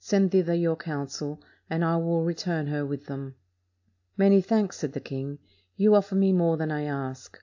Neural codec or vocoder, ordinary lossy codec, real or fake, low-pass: none; AAC, 48 kbps; real; 7.2 kHz